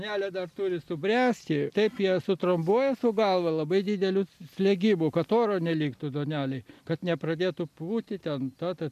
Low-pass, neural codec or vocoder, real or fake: 14.4 kHz; none; real